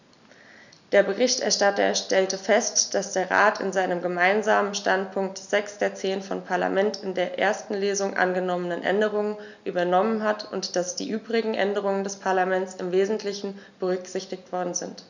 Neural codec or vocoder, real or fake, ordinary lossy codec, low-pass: none; real; none; 7.2 kHz